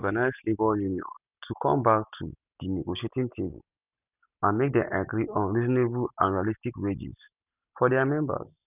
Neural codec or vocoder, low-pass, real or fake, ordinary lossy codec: none; 3.6 kHz; real; none